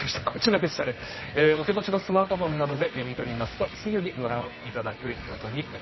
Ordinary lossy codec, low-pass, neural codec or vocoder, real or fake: MP3, 24 kbps; 7.2 kHz; codec, 24 kHz, 0.9 kbps, WavTokenizer, medium music audio release; fake